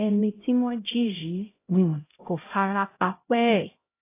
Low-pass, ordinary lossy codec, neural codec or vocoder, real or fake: 3.6 kHz; AAC, 24 kbps; codec, 16 kHz, 0.5 kbps, X-Codec, HuBERT features, trained on LibriSpeech; fake